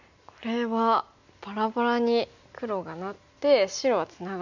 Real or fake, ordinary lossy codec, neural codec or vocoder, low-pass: real; none; none; 7.2 kHz